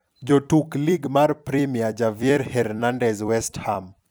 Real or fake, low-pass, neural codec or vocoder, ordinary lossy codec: fake; none; vocoder, 44.1 kHz, 128 mel bands every 256 samples, BigVGAN v2; none